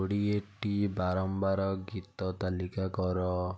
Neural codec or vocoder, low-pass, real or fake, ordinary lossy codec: none; none; real; none